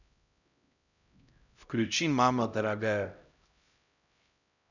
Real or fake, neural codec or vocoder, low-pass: fake; codec, 16 kHz, 0.5 kbps, X-Codec, HuBERT features, trained on LibriSpeech; 7.2 kHz